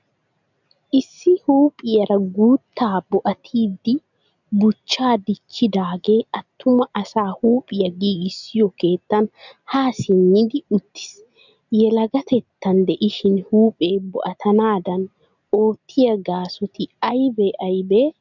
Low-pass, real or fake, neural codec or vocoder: 7.2 kHz; real; none